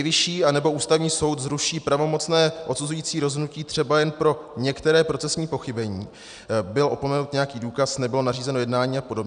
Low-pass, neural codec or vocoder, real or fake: 9.9 kHz; none; real